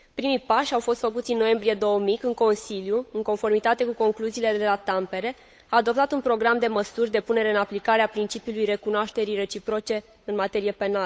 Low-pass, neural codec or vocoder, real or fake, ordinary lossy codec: none; codec, 16 kHz, 8 kbps, FunCodec, trained on Chinese and English, 25 frames a second; fake; none